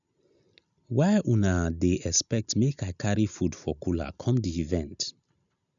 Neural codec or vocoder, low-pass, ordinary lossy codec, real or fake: none; 7.2 kHz; none; real